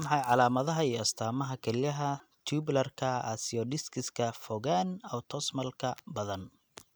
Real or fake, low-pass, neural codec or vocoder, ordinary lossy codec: real; none; none; none